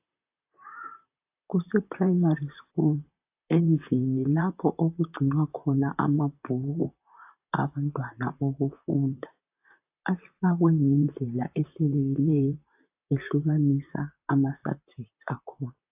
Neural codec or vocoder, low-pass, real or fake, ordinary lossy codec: vocoder, 44.1 kHz, 128 mel bands, Pupu-Vocoder; 3.6 kHz; fake; AAC, 32 kbps